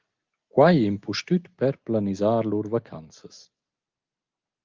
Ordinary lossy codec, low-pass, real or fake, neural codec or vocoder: Opus, 32 kbps; 7.2 kHz; real; none